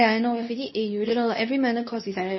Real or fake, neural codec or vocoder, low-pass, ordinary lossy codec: fake; codec, 24 kHz, 0.9 kbps, WavTokenizer, medium speech release version 2; 7.2 kHz; MP3, 24 kbps